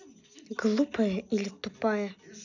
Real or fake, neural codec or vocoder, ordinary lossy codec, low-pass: real; none; none; 7.2 kHz